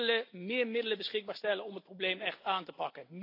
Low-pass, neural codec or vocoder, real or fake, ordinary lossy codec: 5.4 kHz; none; real; AAC, 32 kbps